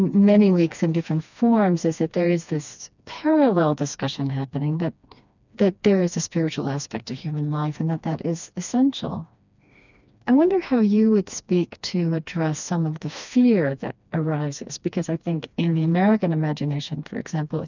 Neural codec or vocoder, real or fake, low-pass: codec, 16 kHz, 2 kbps, FreqCodec, smaller model; fake; 7.2 kHz